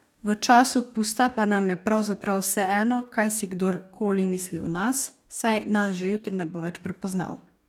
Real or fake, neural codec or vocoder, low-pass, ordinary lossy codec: fake; codec, 44.1 kHz, 2.6 kbps, DAC; 19.8 kHz; none